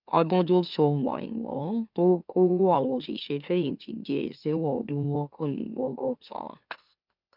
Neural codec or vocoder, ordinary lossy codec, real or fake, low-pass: autoencoder, 44.1 kHz, a latent of 192 numbers a frame, MeloTTS; none; fake; 5.4 kHz